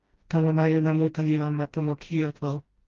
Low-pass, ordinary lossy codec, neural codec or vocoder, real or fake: 7.2 kHz; Opus, 32 kbps; codec, 16 kHz, 1 kbps, FreqCodec, smaller model; fake